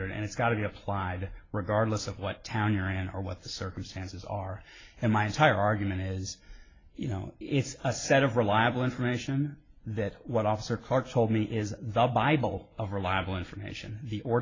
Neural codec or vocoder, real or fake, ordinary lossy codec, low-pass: vocoder, 44.1 kHz, 128 mel bands every 256 samples, BigVGAN v2; fake; AAC, 32 kbps; 7.2 kHz